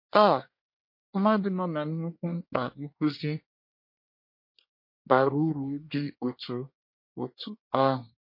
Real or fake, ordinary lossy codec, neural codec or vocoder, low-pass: fake; MP3, 32 kbps; codec, 24 kHz, 1 kbps, SNAC; 5.4 kHz